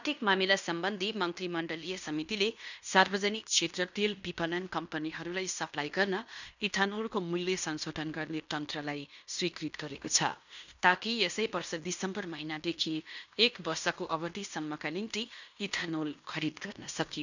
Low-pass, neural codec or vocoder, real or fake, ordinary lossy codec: 7.2 kHz; codec, 16 kHz in and 24 kHz out, 0.9 kbps, LongCat-Audio-Codec, fine tuned four codebook decoder; fake; none